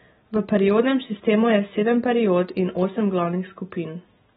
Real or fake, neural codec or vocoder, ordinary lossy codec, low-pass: real; none; AAC, 16 kbps; 19.8 kHz